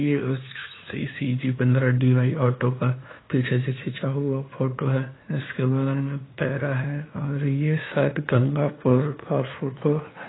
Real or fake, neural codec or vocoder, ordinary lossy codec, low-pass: fake; codec, 16 kHz, 2 kbps, FunCodec, trained on LibriTTS, 25 frames a second; AAC, 16 kbps; 7.2 kHz